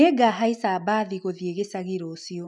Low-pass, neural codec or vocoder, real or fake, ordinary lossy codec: 10.8 kHz; none; real; none